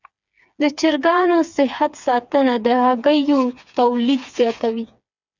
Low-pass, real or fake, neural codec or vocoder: 7.2 kHz; fake; codec, 16 kHz, 4 kbps, FreqCodec, smaller model